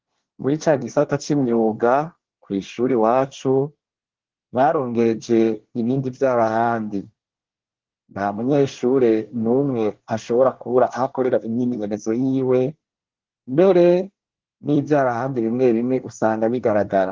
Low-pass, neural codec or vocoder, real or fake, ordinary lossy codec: 7.2 kHz; codec, 24 kHz, 1 kbps, SNAC; fake; Opus, 16 kbps